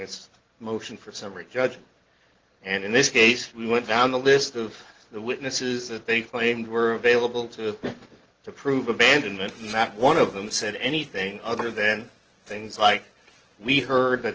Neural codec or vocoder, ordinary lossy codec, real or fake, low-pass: none; Opus, 16 kbps; real; 7.2 kHz